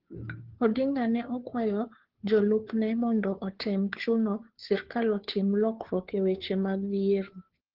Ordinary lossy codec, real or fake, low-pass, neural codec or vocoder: Opus, 16 kbps; fake; 5.4 kHz; codec, 16 kHz, 2 kbps, FunCodec, trained on Chinese and English, 25 frames a second